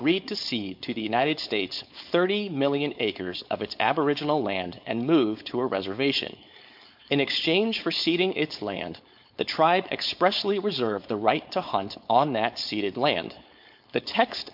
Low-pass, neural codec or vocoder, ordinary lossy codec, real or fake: 5.4 kHz; codec, 16 kHz, 4.8 kbps, FACodec; MP3, 48 kbps; fake